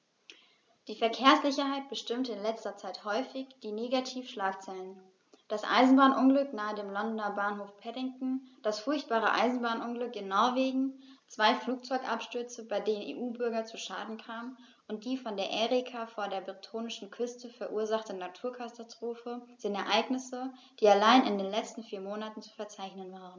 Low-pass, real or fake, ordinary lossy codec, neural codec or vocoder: none; real; none; none